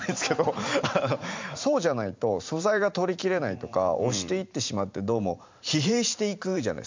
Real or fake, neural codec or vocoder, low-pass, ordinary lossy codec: fake; vocoder, 44.1 kHz, 128 mel bands every 512 samples, BigVGAN v2; 7.2 kHz; MP3, 64 kbps